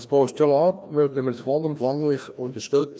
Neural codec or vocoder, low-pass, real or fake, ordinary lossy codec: codec, 16 kHz, 1 kbps, FreqCodec, larger model; none; fake; none